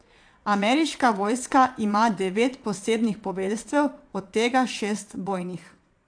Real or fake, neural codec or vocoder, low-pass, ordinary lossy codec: fake; vocoder, 22.05 kHz, 80 mel bands, Vocos; 9.9 kHz; AAC, 64 kbps